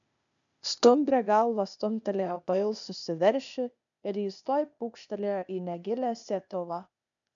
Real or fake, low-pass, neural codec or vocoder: fake; 7.2 kHz; codec, 16 kHz, 0.8 kbps, ZipCodec